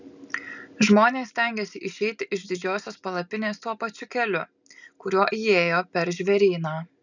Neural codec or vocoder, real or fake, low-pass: none; real; 7.2 kHz